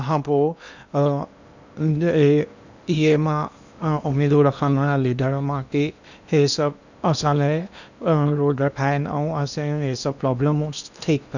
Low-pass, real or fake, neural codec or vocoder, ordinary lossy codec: 7.2 kHz; fake; codec, 16 kHz in and 24 kHz out, 0.8 kbps, FocalCodec, streaming, 65536 codes; none